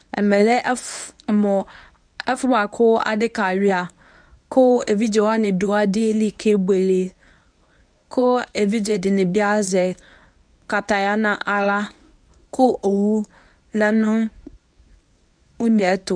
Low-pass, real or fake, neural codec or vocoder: 9.9 kHz; fake; codec, 24 kHz, 0.9 kbps, WavTokenizer, medium speech release version 1